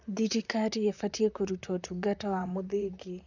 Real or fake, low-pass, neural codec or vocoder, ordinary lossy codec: fake; 7.2 kHz; vocoder, 44.1 kHz, 128 mel bands, Pupu-Vocoder; none